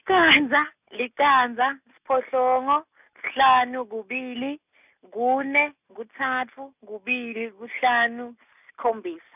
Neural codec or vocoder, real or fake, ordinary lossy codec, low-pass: none; real; none; 3.6 kHz